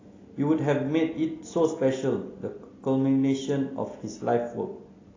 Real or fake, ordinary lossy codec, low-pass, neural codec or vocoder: real; AAC, 32 kbps; 7.2 kHz; none